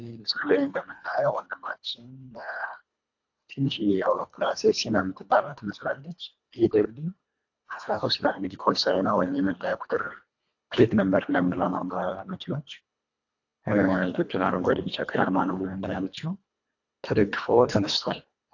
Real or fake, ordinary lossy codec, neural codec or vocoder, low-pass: fake; AAC, 48 kbps; codec, 24 kHz, 1.5 kbps, HILCodec; 7.2 kHz